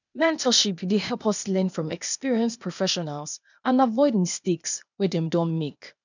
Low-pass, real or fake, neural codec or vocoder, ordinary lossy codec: 7.2 kHz; fake; codec, 16 kHz, 0.8 kbps, ZipCodec; none